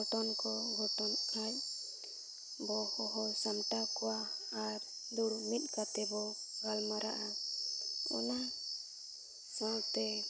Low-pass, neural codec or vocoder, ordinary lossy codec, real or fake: none; none; none; real